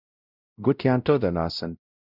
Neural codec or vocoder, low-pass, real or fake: codec, 16 kHz, 0.5 kbps, X-Codec, WavLM features, trained on Multilingual LibriSpeech; 5.4 kHz; fake